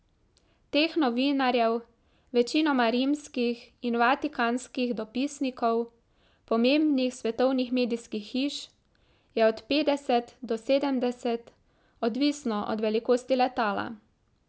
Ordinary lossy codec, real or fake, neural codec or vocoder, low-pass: none; real; none; none